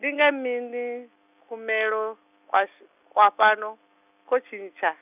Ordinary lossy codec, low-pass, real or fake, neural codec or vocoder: none; 3.6 kHz; real; none